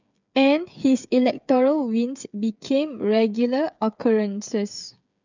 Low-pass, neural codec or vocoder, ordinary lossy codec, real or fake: 7.2 kHz; codec, 16 kHz, 8 kbps, FreqCodec, smaller model; none; fake